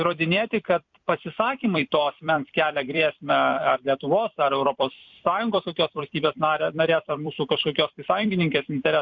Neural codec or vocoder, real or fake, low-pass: vocoder, 44.1 kHz, 128 mel bands every 256 samples, BigVGAN v2; fake; 7.2 kHz